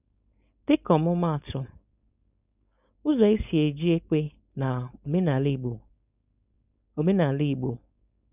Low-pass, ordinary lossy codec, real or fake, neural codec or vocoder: 3.6 kHz; none; fake; codec, 16 kHz, 4.8 kbps, FACodec